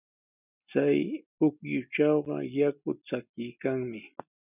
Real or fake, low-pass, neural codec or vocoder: real; 3.6 kHz; none